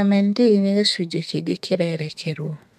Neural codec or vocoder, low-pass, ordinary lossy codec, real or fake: codec, 32 kHz, 1.9 kbps, SNAC; 14.4 kHz; none; fake